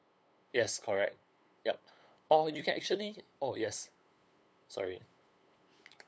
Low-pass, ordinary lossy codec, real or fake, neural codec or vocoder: none; none; fake; codec, 16 kHz, 8 kbps, FunCodec, trained on LibriTTS, 25 frames a second